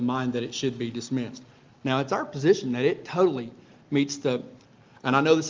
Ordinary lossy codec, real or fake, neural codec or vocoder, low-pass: Opus, 32 kbps; real; none; 7.2 kHz